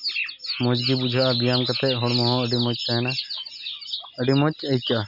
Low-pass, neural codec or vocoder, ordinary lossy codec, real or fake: 5.4 kHz; none; none; real